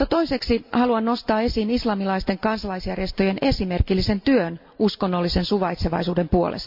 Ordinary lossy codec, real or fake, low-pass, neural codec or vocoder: MP3, 48 kbps; real; 5.4 kHz; none